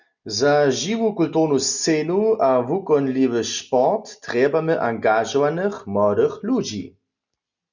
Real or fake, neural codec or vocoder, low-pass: real; none; 7.2 kHz